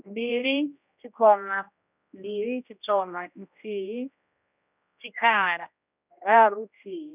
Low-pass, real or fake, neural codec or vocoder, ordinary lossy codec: 3.6 kHz; fake; codec, 16 kHz, 1 kbps, X-Codec, HuBERT features, trained on general audio; none